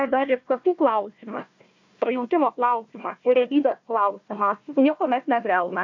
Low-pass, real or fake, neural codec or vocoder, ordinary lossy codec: 7.2 kHz; fake; codec, 16 kHz, 1 kbps, FunCodec, trained on Chinese and English, 50 frames a second; AAC, 48 kbps